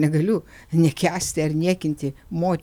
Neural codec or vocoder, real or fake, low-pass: none; real; 19.8 kHz